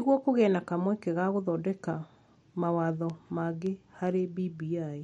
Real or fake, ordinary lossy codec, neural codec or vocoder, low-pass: real; MP3, 48 kbps; none; 19.8 kHz